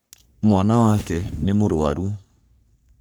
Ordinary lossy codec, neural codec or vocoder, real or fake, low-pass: none; codec, 44.1 kHz, 3.4 kbps, Pupu-Codec; fake; none